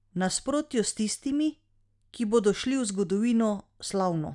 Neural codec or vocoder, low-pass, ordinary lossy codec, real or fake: none; 10.8 kHz; none; real